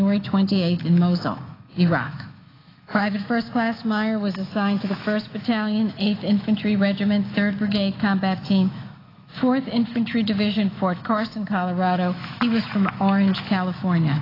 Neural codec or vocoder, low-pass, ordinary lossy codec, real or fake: autoencoder, 48 kHz, 128 numbers a frame, DAC-VAE, trained on Japanese speech; 5.4 kHz; AAC, 24 kbps; fake